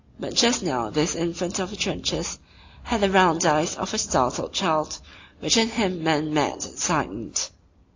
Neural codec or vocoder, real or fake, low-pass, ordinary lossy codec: none; real; 7.2 kHz; AAC, 48 kbps